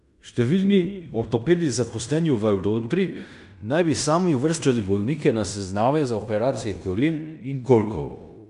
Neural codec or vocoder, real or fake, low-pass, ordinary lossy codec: codec, 16 kHz in and 24 kHz out, 0.9 kbps, LongCat-Audio-Codec, fine tuned four codebook decoder; fake; 10.8 kHz; none